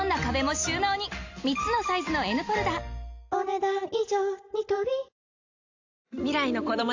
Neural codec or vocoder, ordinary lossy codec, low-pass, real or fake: none; AAC, 48 kbps; 7.2 kHz; real